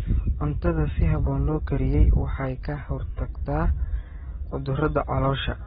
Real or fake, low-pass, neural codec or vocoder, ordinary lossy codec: real; 19.8 kHz; none; AAC, 16 kbps